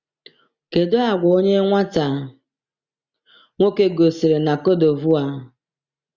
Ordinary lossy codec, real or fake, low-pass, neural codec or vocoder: Opus, 64 kbps; real; 7.2 kHz; none